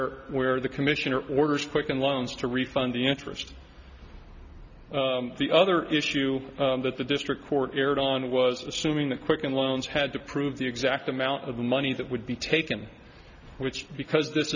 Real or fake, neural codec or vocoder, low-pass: real; none; 7.2 kHz